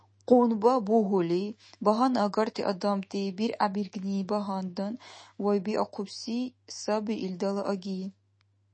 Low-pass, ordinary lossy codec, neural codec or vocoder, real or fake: 9.9 kHz; MP3, 32 kbps; autoencoder, 48 kHz, 128 numbers a frame, DAC-VAE, trained on Japanese speech; fake